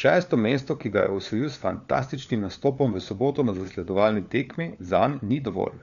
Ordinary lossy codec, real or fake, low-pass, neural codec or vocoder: none; fake; 7.2 kHz; codec, 16 kHz, 4 kbps, FunCodec, trained on LibriTTS, 50 frames a second